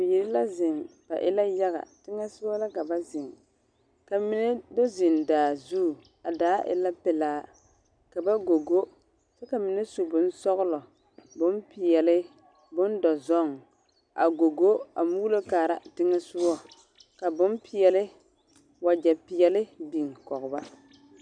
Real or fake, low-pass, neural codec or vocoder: real; 9.9 kHz; none